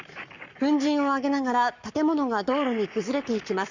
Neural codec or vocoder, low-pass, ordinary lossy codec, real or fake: codec, 44.1 kHz, 7.8 kbps, Pupu-Codec; 7.2 kHz; Opus, 64 kbps; fake